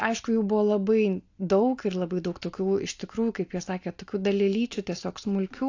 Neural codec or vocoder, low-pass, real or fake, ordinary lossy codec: none; 7.2 kHz; real; AAC, 48 kbps